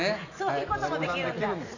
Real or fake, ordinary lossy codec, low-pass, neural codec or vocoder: real; none; 7.2 kHz; none